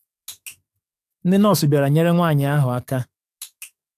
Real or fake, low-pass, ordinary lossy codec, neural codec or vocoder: fake; 14.4 kHz; AAC, 96 kbps; codec, 44.1 kHz, 7.8 kbps, DAC